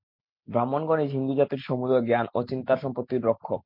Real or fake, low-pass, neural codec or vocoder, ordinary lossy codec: real; 5.4 kHz; none; MP3, 48 kbps